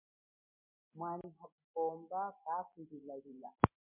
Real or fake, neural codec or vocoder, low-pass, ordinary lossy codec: real; none; 3.6 kHz; MP3, 32 kbps